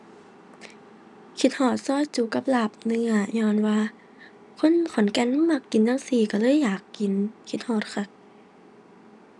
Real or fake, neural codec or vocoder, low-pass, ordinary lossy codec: real; none; 10.8 kHz; none